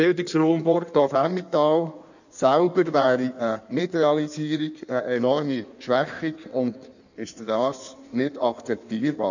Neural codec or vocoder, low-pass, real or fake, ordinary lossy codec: codec, 16 kHz in and 24 kHz out, 1.1 kbps, FireRedTTS-2 codec; 7.2 kHz; fake; none